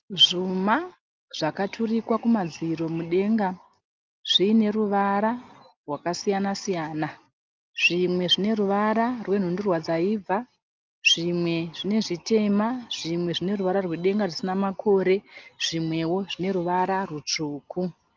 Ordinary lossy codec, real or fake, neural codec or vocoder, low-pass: Opus, 24 kbps; real; none; 7.2 kHz